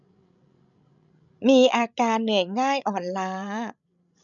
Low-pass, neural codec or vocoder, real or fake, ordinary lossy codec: 7.2 kHz; codec, 16 kHz, 16 kbps, FreqCodec, larger model; fake; none